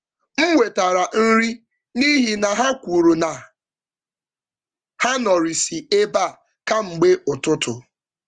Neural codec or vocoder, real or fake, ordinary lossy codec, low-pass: none; real; Opus, 24 kbps; 9.9 kHz